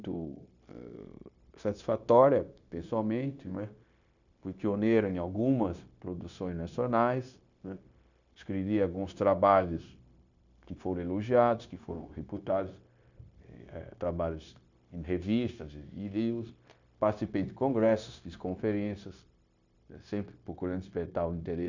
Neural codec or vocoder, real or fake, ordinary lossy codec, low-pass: codec, 16 kHz, 0.9 kbps, LongCat-Audio-Codec; fake; none; 7.2 kHz